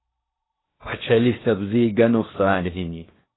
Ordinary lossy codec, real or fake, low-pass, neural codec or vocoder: AAC, 16 kbps; fake; 7.2 kHz; codec, 16 kHz in and 24 kHz out, 0.8 kbps, FocalCodec, streaming, 65536 codes